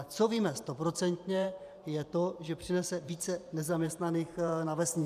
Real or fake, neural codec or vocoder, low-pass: fake; vocoder, 44.1 kHz, 128 mel bands every 512 samples, BigVGAN v2; 14.4 kHz